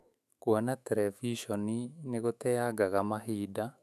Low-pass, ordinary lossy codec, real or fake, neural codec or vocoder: 14.4 kHz; none; fake; autoencoder, 48 kHz, 128 numbers a frame, DAC-VAE, trained on Japanese speech